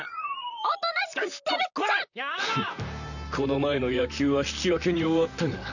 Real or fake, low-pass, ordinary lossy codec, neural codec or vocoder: fake; 7.2 kHz; none; vocoder, 44.1 kHz, 128 mel bands, Pupu-Vocoder